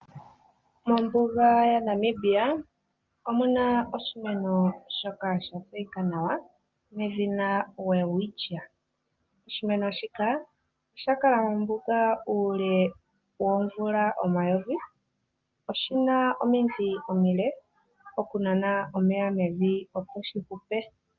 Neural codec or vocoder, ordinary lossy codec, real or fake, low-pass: none; Opus, 32 kbps; real; 7.2 kHz